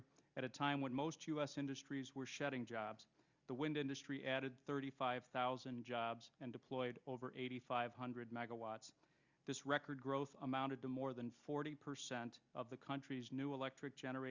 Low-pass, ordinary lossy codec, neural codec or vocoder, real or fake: 7.2 kHz; Opus, 64 kbps; none; real